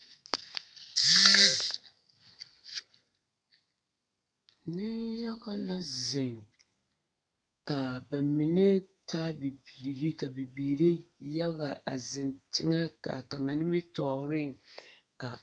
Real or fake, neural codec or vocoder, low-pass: fake; codec, 32 kHz, 1.9 kbps, SNAC; 9.9 kHz